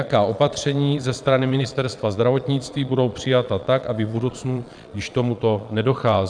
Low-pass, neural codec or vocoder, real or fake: 9.9 kHz; vocoder, 22.05 kHz, 80 mel bands, Vocos; fake